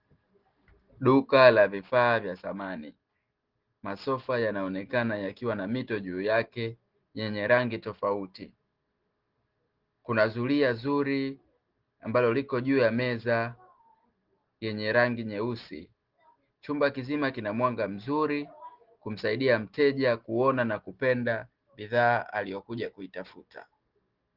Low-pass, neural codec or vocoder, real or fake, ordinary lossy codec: 5.4 kHz; none; real; Opus, 16 kbps